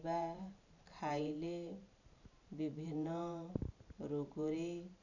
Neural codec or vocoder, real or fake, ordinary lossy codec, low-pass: vocoder, 44.1 kHz, 128 mel bands every 512 samples, BigVGAN v2; fake; none; 7.2 kHz